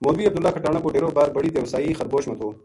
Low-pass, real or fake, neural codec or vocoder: 10.8 kHz; real; none